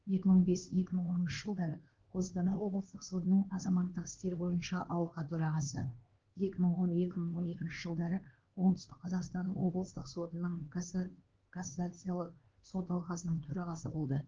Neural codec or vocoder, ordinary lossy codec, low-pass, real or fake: codec, 16 kHz, 2 kbps, X-Codec, HuBERT features, trained on LibriSpeech; Opus, 16 kbps; 7.2 kHz; fake